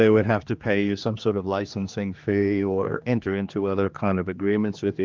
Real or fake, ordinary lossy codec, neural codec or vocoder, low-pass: fake; Opus, 32 kbps; codec, 16 kHz, 2 kbps, X-Codec, HuBERT features, trained on general audio; 7.2 kHz